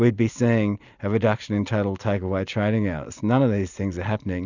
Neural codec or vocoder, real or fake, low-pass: none; real; 7.2 kHz